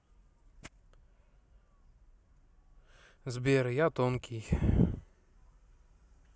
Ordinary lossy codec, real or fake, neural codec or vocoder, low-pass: none; real; none; none